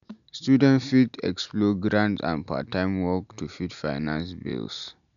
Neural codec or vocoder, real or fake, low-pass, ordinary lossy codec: none; real; 7.2 kHz; none